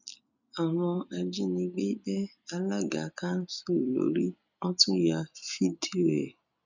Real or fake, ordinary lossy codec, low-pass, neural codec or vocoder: real; none; 7.2 kHz; none